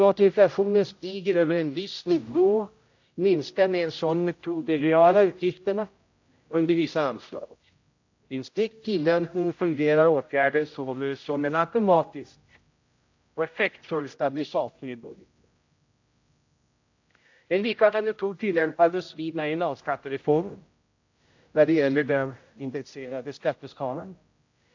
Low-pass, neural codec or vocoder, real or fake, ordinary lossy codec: 7.2 kHz; codec, 16 kHz, 0.5 kbps, X-Codec, HuBERT features, trained on general audio; fake; AAC, 48 kbps